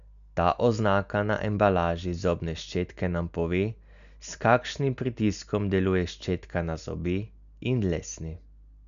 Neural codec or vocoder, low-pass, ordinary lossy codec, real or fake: none; 7.2 kHz; none; real